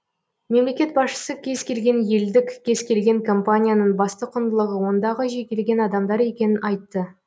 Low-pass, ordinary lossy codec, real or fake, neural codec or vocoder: none; none; real; none